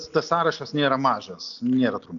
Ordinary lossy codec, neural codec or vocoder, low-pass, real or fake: AAC, 64 kbps; none; 7.2 kHz; real